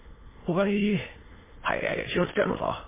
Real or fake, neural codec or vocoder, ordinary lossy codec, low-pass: fake; autoencoder, 22.05 kHz, a latent of 192 numbers a frame, VITS, trained on many speakers; MP3, 16 kbps; 3.6 kHz